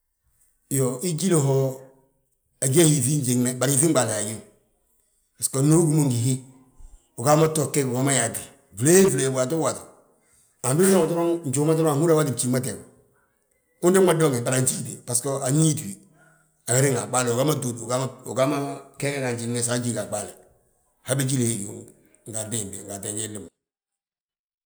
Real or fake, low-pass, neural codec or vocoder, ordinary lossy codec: real; none; none; none